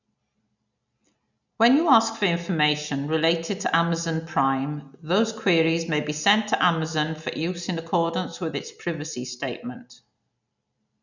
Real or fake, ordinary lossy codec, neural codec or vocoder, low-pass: real; none; none; 7.2 kHz